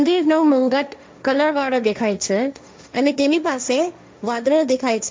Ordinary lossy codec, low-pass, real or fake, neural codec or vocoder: none; none; fake; codec, 16 kHz, 1.1 kbps, Voila-Tokenizer